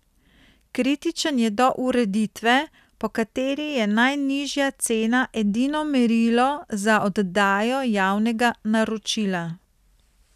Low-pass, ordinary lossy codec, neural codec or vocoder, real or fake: 14.4 kHz; none; none; real